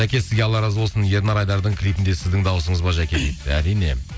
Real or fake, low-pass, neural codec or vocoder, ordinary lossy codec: real; none; none; none